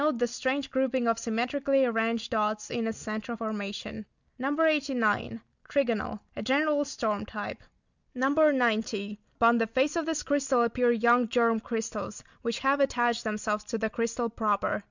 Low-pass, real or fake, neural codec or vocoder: 7.2 kHz; real; none